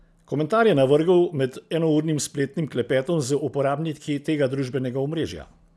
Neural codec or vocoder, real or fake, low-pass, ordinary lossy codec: none; real; none; none